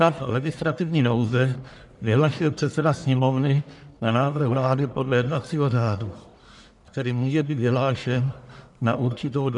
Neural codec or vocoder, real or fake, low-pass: codec, 44.1 kHz, 1.7 kbps, Pupu-Codec; fake; 10.8 kHz